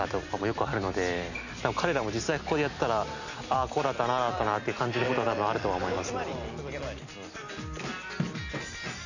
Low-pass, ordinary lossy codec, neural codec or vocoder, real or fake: 7.2 kHz; none; none; real